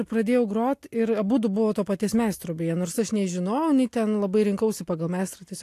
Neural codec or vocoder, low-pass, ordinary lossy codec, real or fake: none; 14.4 kHz; AAC, 64 kbps; real